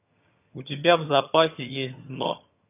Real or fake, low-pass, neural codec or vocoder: fake; 3.6 kHz; vocoder, 22.05 kHz, 80 mel bands, HiFi-GAN